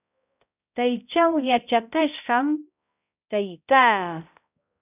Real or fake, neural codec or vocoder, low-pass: fake; codec, 16 kHz, 0.5 kbps, X-Codec, HuBERT features, trained on balanced general audio; 3.6 kHz